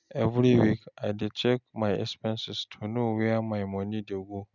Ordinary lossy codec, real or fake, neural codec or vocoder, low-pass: none; real; none; 7.2 kHz